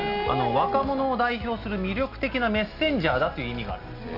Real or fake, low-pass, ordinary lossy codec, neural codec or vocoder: real; 5.4 kHz; Opus, 64 kbps; none